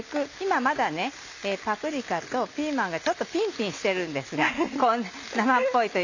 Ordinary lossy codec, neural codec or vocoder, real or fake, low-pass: none; none; real; 7.2 kHz